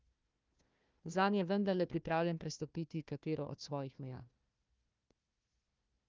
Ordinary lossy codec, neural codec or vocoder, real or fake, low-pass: Opus, 24 kbps; codec, 16 kHz, 1 kbps, FunCodec, trained on Chinese and English, 50 frames a second; fake; 7.2 kHz